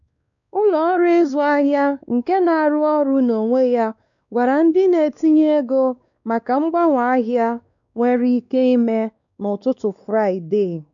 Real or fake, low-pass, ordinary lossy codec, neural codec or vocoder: fake; 7.2 kHz; none; codec, 16 kHz, 2 kbps, X-Codec, WavLM features, trained on Multilingual LibriSpeech